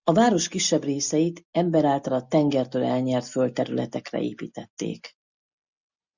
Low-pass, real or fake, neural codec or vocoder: 7.2 kHz; real; none